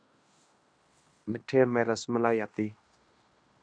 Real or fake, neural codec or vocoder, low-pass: fake; codec, 16 kHz in and 24 kHz out, 0.9 kbps, LongCat-Audio-Codec, fine tuned four codebook decoder; 9.9 kHz